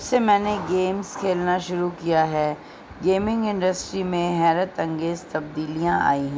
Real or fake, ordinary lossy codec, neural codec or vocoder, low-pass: real; none; none; none